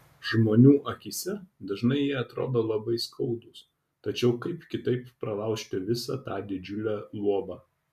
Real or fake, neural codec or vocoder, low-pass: real; none; 14.4 kHz